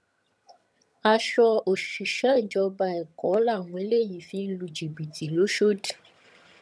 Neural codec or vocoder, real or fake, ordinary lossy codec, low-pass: vocoder, 22.05 kHz, 80 mel bands, HiFi-GAN; fake; none; none